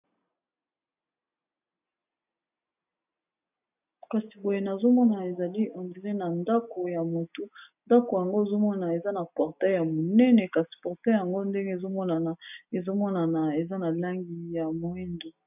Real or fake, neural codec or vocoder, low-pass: real; none; 3.6 kHz